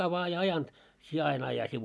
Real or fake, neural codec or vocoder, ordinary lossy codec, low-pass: real; none; none; none